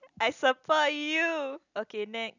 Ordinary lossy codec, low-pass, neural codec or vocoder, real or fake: none; 7.2 kHz; none; real